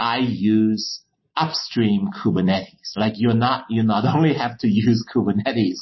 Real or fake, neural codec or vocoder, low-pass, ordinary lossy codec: real; none; 7.2 kHz; MP3, 24 kbps